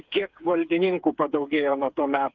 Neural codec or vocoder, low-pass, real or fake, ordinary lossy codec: codec, 16 kHz, 4 kbps, FreqCodec, smaller model; 7.2 kHz; fake; Opus, 24 kbps